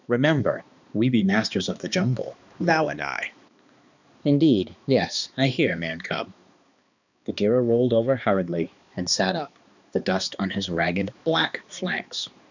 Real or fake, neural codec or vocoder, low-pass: fake; codec, 16 kHz, 2 kbps, X-Codec, HuBERT features, trained on balanced general audio; 7.2 kHz